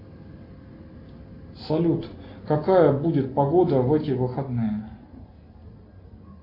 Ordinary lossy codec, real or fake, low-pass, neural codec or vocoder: AAC, 32 kbps; real; 5.4 kHz; none